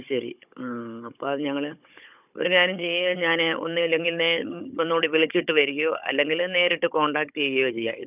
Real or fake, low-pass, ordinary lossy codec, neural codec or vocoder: fake; 3.6 kHz; none; codec, 16 kHz, 16 kbps, FunCodec, trained on Chinese and English, 50 frames a second